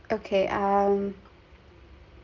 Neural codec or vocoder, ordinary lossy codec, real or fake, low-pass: none; Opus, 32 kbps; real; 7.2 kHz